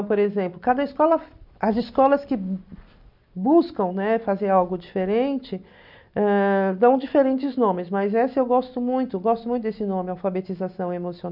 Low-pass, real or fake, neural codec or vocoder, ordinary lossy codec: 5.4 kHz; real; none; none